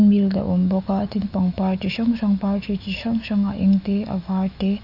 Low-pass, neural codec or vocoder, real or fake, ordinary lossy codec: 5.4 kHz; autoencoder, 48 kHz, 128 numbers a frame, DAC-VAE, trained on Japanese speech; fake; none